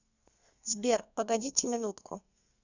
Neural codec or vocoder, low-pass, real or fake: codec, 32 kHz, 1.9 kbps, SNAC; 7.2 kHz; fake